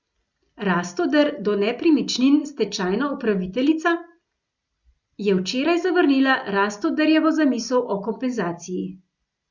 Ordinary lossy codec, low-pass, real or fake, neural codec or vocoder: Opus, 64 kbps; 7.2 kHz; real; none